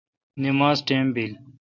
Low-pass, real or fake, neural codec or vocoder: 7.2 kHz; real; none